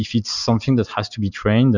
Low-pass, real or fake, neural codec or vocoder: 7.2 kHz; real; none